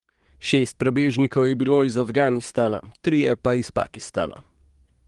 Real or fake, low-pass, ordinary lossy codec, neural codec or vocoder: fake; 10.8 kHz; Opus, 24 kbps; codec, 24 kHz, 1 kbps, SNAC